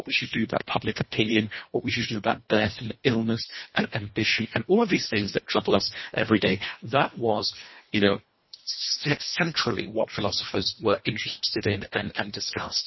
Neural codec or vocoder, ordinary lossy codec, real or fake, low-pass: codec, 24 kHz, 1.5 kbps, HILCodec; MP3, 24 kbps; fake; 7.2 kHz